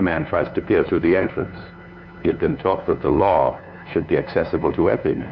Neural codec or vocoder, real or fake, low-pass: codec, 16 kHz, 2 kbps, FunCodec, trained on LibriTTS, 25 frames a second; fake; 7.2 kHz